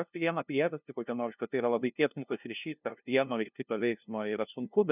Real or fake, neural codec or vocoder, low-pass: fake; codec, 16 kHz, 0.5 kbps, FunCodec, trained on LibriTTS, 25 frames a second; 3.6 kHz